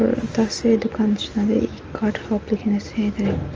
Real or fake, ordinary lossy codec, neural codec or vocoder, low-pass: real; Opus, 24 kbps; none; 7.2 kHz